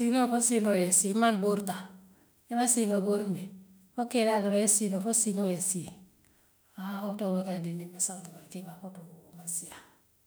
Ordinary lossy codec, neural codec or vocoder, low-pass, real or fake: none; autoencoder, 48 kHz, 32 numbers a frame, DAC-VAE, trained on Japanese speech; none; fake